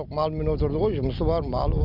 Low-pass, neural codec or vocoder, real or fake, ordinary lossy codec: 5.4 kHz; none; real; none